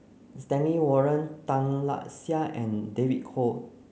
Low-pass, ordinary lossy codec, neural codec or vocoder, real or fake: none; none; none; real